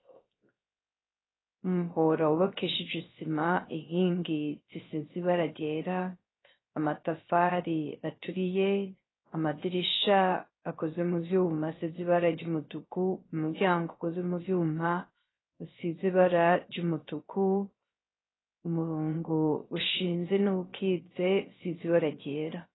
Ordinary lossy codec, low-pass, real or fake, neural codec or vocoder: AAC, 16 kbps; 7.2 kHz; fake; codec, 16 kHz, 0.3 kbps, FocalCodec